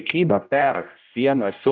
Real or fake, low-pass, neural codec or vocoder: fake; 7.2 kHz; codec, 16 kHz, 0.5 kbps, X-Codec, HuBERT features, trained on general audio